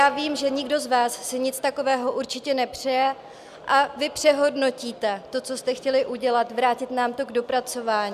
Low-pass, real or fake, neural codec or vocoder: 14.4 kHz; real; none